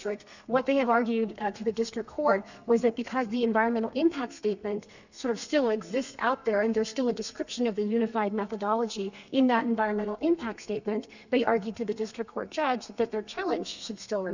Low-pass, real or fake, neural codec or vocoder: 7.2 kHz; fake; codec, 32 kHz, 1.9 kbps, SNAC